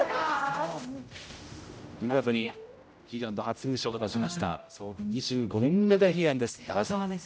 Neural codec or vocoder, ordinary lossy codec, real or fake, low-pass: codec, 16 kHz, 0.5 kbps, X-Codec, HuBERT features, trained on general audio; none; fake; none